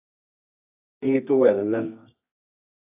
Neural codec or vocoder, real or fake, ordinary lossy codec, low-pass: codec, 24 kHz, 0.9 kbps, WavTokenizer, medium music audio release; fake; AAC, 24 kbps; 3.6 kHz